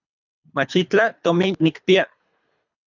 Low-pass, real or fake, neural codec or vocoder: 7.2 kHz; fake; codec, 24 kHz, 3 kbps, HILCodec